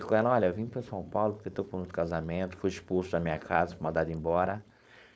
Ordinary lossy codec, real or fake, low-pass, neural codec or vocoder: none; fake; none; codec, 16 kHz, 4.8 kbps, FACodec